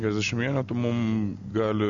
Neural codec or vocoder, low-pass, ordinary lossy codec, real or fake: none; 7.2 kHz; Opus, 64 kbps; real